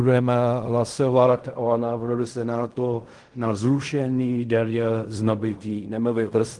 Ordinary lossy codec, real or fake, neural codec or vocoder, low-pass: Opus, 24 kbps; fake; codec, 16 kHz in and 24 kHz out, 0.4 kbps, LongCat-Audio-Codec, fine tuned four codebook decoder; 10.8 kHz